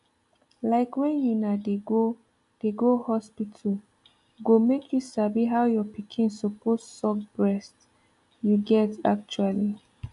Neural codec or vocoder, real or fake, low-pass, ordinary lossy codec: none; real; 10.8 kHz; none